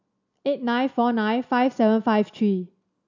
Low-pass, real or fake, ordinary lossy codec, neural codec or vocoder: 7.2 kHz; real; none; none